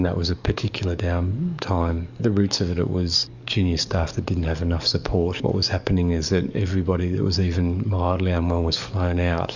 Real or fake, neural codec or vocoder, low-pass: fake; codec, 44.1 kHz, 7.8 kbps, DAC; 7.2 kHz